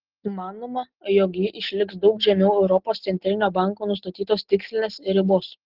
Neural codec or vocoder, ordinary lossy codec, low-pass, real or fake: none; Opus, 16 kbps; 5.4 kHz; real